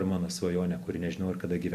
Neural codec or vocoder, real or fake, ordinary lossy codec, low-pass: none; real; MP3, 64 kbps; 14.4 kHz